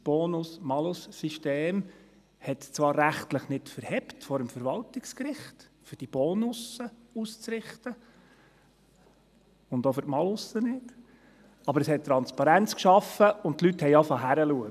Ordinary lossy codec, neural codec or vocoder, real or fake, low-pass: none; none; real; 14.4 kHz